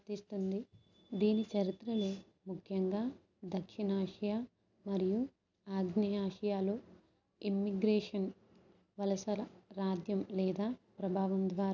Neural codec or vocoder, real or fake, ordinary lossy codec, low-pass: none; real; none; 7.2 kHz